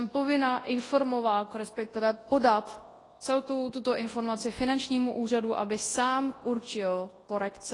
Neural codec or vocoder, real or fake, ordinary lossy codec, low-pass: codec, 24 kHz, 0.9 kbps, WavTokenizer, large speech release; fake; AAC, 32 kbps; 10.8 kHz